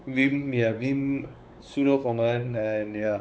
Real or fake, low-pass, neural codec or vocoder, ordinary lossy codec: fake; none; codec, 16 kHz, 4 kbps, X-Codec, WavLM features, trained on Multilingual LibriSpeech; none